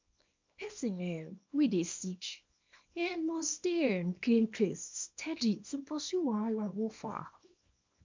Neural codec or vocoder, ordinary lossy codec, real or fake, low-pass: codec, 24 kHz, 0.9 kbps, WavTokenizer, small release; MP3, 64 kbps; fake; 7.2 kHz